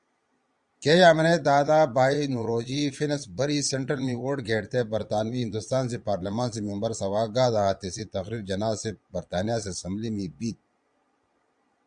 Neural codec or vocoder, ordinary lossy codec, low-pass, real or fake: vocoder, 22.05 kHz, 80 mel bands, Vocos; Opus, 64 kbps; 9.9 kHz; fake